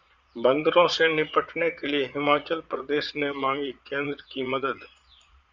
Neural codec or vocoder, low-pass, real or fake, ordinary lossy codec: vocoder, 22.05 kHz, 80 mel bands, Vocos; 7.2 kHz; fake; Opus, 64 kbps